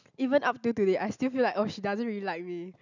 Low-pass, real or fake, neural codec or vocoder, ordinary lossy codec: 7.2 kHz; real; none; none